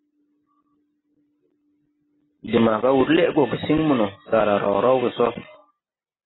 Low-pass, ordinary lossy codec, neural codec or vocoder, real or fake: 7.2 kHz; AAC, 16 kbps; none; real